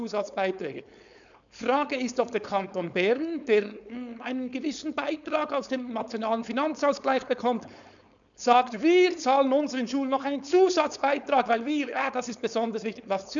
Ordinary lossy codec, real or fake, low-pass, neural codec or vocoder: none; fake; 7.2 kHz; codec, 16 kHz, 4.8 kbps, FACodec